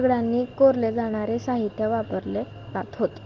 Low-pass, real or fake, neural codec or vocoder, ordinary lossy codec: 7.2 kHz; real; none; Opus, 16 kbps